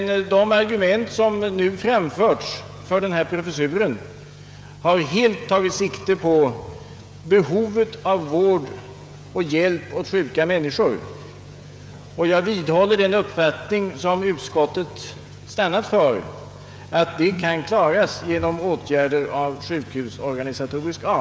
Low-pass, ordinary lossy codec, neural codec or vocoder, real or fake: none; none; codec, 16 kHz, 16 kbps, FreqCodec, smaller model; fake